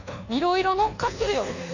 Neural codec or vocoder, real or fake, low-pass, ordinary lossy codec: codec, 24 kHz, 1.2 kbps, DualCodec; fake; 7.2 kHz; none